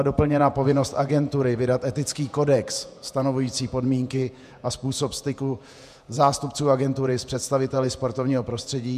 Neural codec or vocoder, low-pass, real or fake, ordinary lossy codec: vocoder, 48 kHz, 128 mel bands, Vocos; 14.4 kHz; fake; MP3, 96 kbps